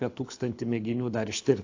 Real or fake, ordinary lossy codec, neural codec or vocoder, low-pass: fake; AAC, 48 kbps; codec, 24 kHz, 6 kbps, HILCodec; 7.2 kHz